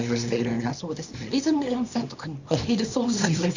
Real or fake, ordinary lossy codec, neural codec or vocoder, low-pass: fake; Opus, 64 kbps; codec, 24 kHz, 0.9 kbps, WavTokenizer, small release; 7.2 kHz